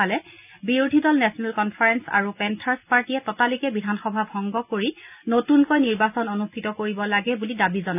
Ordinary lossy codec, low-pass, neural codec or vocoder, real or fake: none; 3.6 kHz; none; real